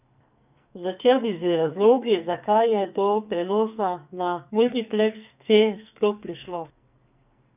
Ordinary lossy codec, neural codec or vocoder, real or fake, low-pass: none; codec, 44.1 kHz, 2.6 kbps, SNAC; fake; 3.6 kHz